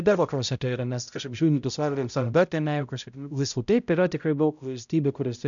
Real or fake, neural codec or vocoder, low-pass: fake; codec, 16 kHz, 0.5 kbps, X-Codec, HuBERT features, trained on balanced general audio; 7.2 kHz